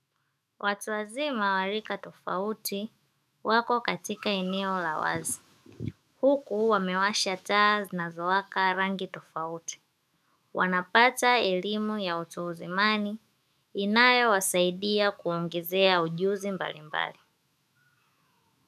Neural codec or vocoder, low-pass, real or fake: autoencoder, 48 kHz, 128 numbers a frame, DAC-VAE, trained on Japanese speech; 14.4 kHz; fake